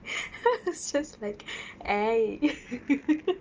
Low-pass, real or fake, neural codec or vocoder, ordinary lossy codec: 7.2 kHz; real; none; Opus, 24 kbps